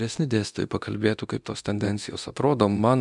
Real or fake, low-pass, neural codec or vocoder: fake; 10.8 kHz; codec, 24 kHz, 0.9 kbps, DualCodec